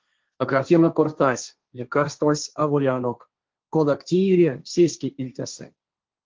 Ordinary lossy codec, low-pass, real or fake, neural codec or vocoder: Opus, 32 kbps; 7.2 kHz; fake; codec, 16 kHz, 1.1 kbps, Voila-Tokenizer